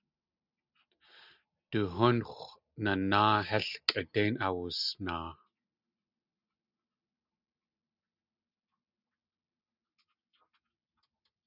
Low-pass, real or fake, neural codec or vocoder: 5.4 kHz; real; none